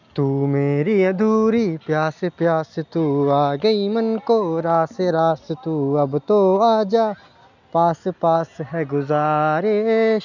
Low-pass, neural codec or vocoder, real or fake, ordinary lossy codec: 7.2 kHz; none; real; none